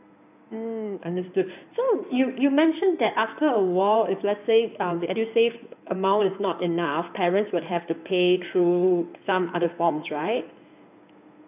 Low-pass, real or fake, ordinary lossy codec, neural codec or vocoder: 3.6 kHz; fake; none; codec, 16 kHz in and 24 kHz out, 2.2 kbps, FireRedTTS-2 codec